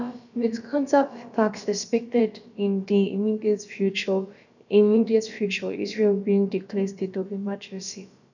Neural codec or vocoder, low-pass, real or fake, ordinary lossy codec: codec, 16 kHz, about 1 kbps, DyCAST, with the encoder's durations; 7.2 kHz; fake; none